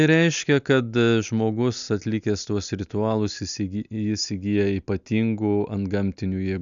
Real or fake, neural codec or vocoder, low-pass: real; none; 7.2 kHz